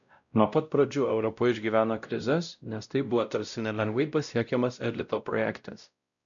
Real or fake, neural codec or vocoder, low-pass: fake; codec, 16 kHz, 0.5 kbps, X-Codec, WavLM features, trained on Multilingual LibriSpeech; 7.2 kHz